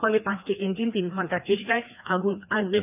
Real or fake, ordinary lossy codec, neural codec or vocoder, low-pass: fake; none; codec, 16 kHz, 2 kbps, FreqCodec, larger model; 3.6 kHz